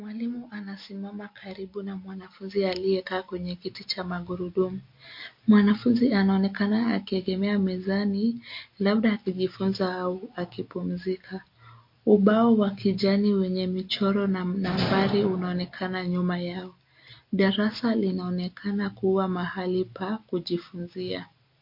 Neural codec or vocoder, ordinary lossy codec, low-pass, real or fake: none; MP3, 32 kbps; 5.4 kHz; real